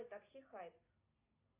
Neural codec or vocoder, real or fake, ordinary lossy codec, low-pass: none; real; AAC, 32 kbps; 3.6 kHz